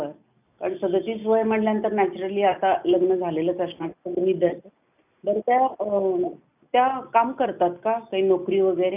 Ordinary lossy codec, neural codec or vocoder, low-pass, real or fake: none; none; 3.6 kHz; real